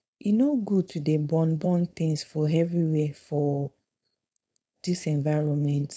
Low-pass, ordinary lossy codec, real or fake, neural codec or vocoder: none; none; fake; codec, 16 kHz, 4.8 kbps, FACodec